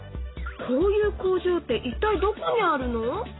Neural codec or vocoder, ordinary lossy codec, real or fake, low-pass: none; AAC, 16 kbps; real; 7.2 kHz